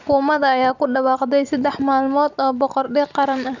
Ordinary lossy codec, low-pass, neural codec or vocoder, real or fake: none; 7.2 kHz; none; real